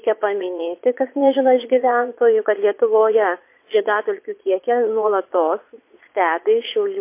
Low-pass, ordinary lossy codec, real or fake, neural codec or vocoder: 3.6 kHz; MP3, 24 kbps; fake; vocoder, 22.05 kHz, 80 mel bands, Vocos